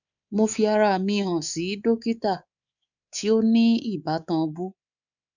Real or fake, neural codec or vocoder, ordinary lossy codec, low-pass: fake; codec, 24 kHz, 3.1 kbps, DualCodec; none; 7.2 kHz